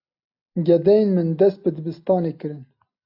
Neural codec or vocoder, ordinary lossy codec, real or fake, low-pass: none; AAC, 48 kbps; real; 5.4 kHz